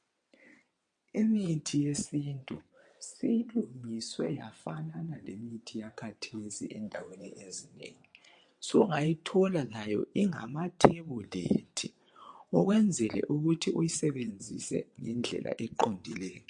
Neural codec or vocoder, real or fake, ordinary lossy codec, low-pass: vocoder, 22.05 kHz, 80 mel bands, WaveNeXt; fake; MP3, 48 kbps; 9.9 kHz